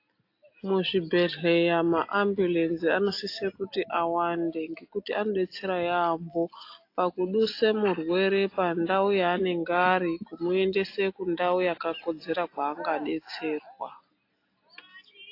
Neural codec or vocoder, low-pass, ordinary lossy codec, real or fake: none; 5.4 kHz; AAC, 32 kbps; real